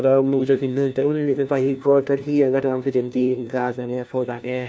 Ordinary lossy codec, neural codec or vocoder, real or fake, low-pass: none; codec, 16 kHz, 1 kbps, FunCodec, trained on LibriTTS, 50 frames a second; fake; none